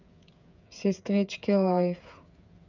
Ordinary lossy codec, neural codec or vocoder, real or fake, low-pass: none; codec, 16 kHz, 8 kbps, FreqCodec, smaller model; fake; 7.2 kHz